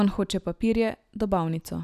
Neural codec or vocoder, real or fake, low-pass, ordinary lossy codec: none; real; 14.4 kHz; none